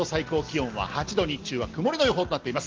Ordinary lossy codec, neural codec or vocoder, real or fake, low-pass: Opus, 16 kbps; none; real; 7.2 kHz